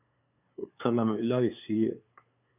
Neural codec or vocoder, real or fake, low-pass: codec, 16 kHz, 2 kbps, FunCodec, trained on LibriTTS, 25 frames a second; fake; 3.6 kHz